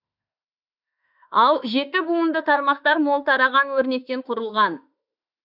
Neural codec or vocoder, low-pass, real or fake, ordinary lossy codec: autoencoder, 48 kHz, 32 numbers a frame, DAC-VAE, trained on Japanese speech; 5.4 kHz; fake; none